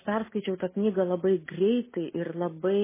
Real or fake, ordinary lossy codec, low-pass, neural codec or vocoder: real; MP3, 16 kbps; 3.6 kHz; none